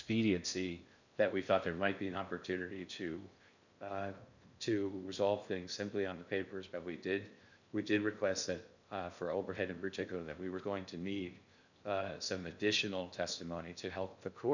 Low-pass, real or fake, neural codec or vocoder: 7.2 kHz; fake; codec, 16 kHz in and 24 kHz out, 0.6 kbps, FocalCodec, streaming, 4096 codes